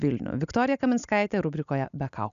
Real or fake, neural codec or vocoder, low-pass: real; none; 7.2 kHz